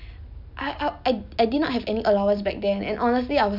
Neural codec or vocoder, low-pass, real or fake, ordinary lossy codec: none; 5.4 kHz; real; none